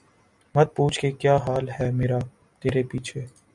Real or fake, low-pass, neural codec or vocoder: real; 10.8 kHz; none